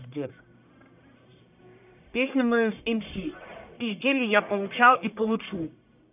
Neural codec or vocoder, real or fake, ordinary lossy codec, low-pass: codec, 44.1 kHz, 1.7 kbps, Pupu-Codec; fake; none; 3.6 kHz